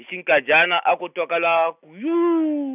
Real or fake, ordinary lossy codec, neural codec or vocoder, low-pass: real; none; none; 3.6 kHz